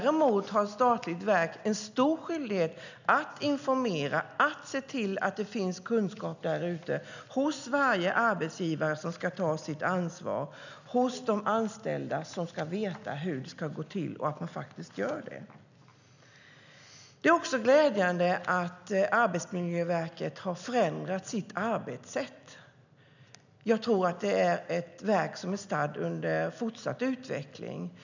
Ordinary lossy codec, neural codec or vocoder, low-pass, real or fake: none; none; 7.2 kHz; real